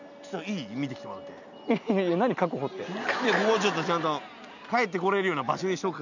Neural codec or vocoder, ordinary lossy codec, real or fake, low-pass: none; none; real; 7.2 kHz